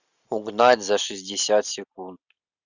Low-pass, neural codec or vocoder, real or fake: 7.2 kHz; none; real